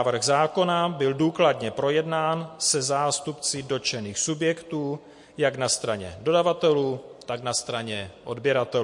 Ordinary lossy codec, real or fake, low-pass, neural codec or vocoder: MP3, 48 kbps; real; 10.8 kHz; none